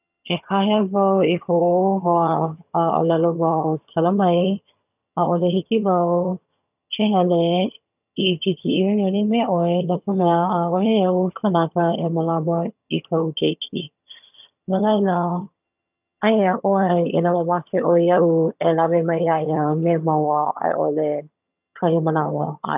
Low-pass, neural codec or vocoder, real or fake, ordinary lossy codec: 3.6 kHz; vocoder, 22.05 kHz, 80 mel bands, HiFi-GAN; fake; none